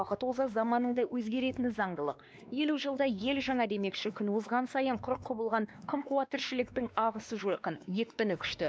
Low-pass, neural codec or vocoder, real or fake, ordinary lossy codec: none; codec, 16 kHz, 2 kbps, X-Codec, WavLM features, trained on Multilingual LibriSpeech; fake; none